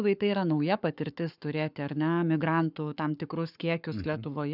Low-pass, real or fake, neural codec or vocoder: 5.4 kHz; fake; codec, 44.1 kHz, 7.8 kbps, Pupu-Codec